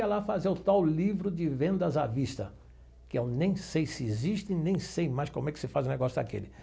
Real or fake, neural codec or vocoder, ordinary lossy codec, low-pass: real; none; none; none